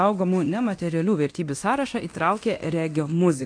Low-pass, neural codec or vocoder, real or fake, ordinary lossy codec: 9.9 kHz; codec, 24 kHz, 0.9 kbps, DualCodec; fake; AAC, 48 kbps